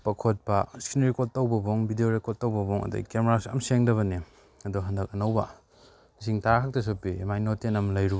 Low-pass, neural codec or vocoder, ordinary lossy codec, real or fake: none; none; none; real